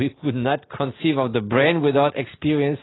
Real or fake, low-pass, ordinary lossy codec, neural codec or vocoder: real; 7.2 kHz; AAC, 16 kbps; none